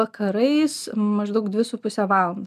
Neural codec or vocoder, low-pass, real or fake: none; 14.4 kHz; real